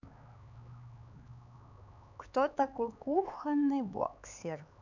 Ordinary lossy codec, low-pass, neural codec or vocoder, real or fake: none; 7.2 kHz; codec, 16 kHz, 4 kbps, X-Codec, HuBERT features, trained on LibriSpeech; fake